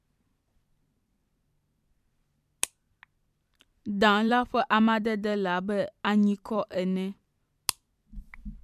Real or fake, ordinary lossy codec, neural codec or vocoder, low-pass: fake; MP3, 96 kbps; vocoder, 44.1 kHz, 128 mel bands every 256 samples, BigVGAN v2; 14.4 kHz